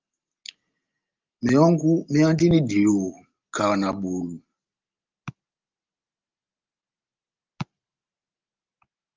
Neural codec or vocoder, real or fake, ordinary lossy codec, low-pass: none; real; Opus, 32 kbps; 7.2 kHz